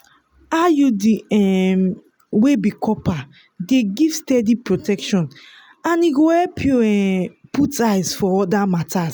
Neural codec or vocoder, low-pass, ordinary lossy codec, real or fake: none; none; none; real